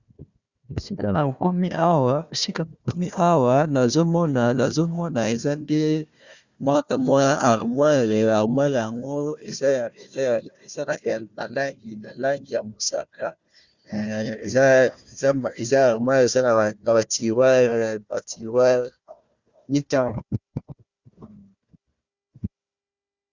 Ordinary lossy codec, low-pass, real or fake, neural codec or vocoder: Opus, 64 kbps; 7.2 kHz; fake; codec, 16 kHz, 1 kbps, FunCodec, trained on Chinese and English, 50 frames a second